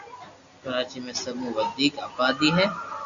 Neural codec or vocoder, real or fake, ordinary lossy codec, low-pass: none; real; Opus, 64 kbps; 7.2 kHz